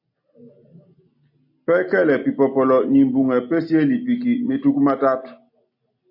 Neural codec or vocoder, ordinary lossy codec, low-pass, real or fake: none; MP3, 32 kbps; 5.4 kHz; real